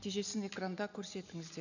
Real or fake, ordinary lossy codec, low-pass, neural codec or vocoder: real; none; 7.2 kHz; none